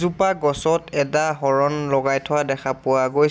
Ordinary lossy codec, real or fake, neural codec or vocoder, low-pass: none; real; none; none